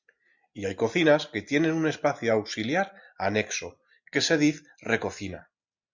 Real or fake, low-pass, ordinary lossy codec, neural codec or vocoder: real; 7.2 kHz; Opus, 64 kbps; none